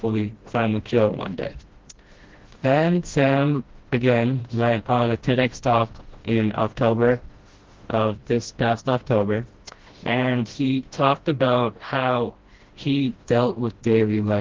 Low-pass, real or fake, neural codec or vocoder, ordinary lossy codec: 7.2 kHz; fake; codec, 16 kHz, 1 kbps, FreqCodec, smaller model; Opus, 16 kbps